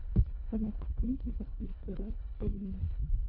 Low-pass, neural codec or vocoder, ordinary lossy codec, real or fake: 5.4 kHz; codec, 24 kHz, 1.5 kbps, HILCodec; none; fake